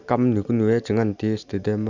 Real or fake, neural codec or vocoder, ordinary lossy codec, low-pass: real; none; none; 7.2 kHz